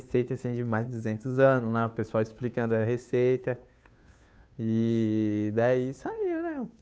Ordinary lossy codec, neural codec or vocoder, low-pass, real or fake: none; codec, 16 kHz, 2 kbps, FunCodec, trained on Chinese and English, 25 frames a second; none; fake